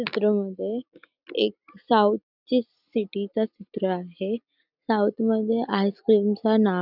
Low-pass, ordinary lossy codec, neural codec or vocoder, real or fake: 5.4 kHz; none; none; real